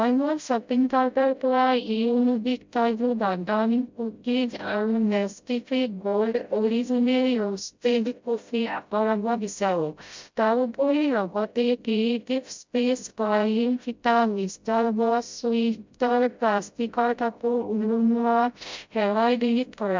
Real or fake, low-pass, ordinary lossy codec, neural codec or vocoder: fake; 7.2 kHz; MP3, 64 kbps; codec, 16 kHz, 0.5 kbps, FreqCodec, smaller model